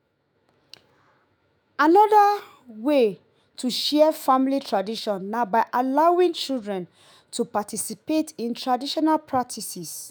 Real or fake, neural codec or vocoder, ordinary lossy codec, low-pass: fake; autoencoder, 48 kHz, 128 numbers a frame, DAC-VAE, trained on Japanese speech; none; none